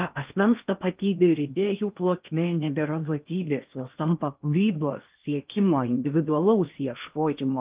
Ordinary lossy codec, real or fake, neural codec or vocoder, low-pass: Opus, 24 kbps; fake; codec, 16 kHz in and 24 kHz out, 0.8 kbps, FocalCodec, streaming, 65536 codes; 3.6 kHz